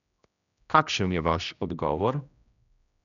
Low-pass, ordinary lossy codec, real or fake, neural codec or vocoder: 7.2 kHz; none; fake; codec, 16 kHz, 1 kbps, X-Codec, HuBERT features, trained on general audio